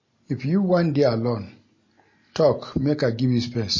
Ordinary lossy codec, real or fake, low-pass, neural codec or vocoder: MP3, 32 kbps; real; 7.2 kHz; none